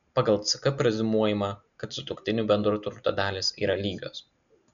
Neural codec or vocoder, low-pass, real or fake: none; 7.2 kHz; real